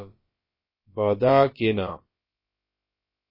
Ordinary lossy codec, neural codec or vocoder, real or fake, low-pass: MP3, 24 kbps; codec, 16 kHz, about 1 kbps, DyCAST, with the encoder's durations; fake; 5.4 kHz